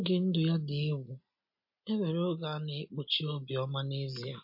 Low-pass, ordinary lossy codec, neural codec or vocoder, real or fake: 5.4 kHz; MP3, 32 kbps; none; real